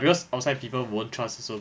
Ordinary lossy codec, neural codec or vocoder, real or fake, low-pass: none; none; real; none